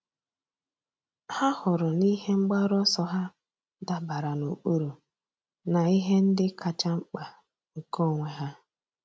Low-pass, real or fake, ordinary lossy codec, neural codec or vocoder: none; real; none; none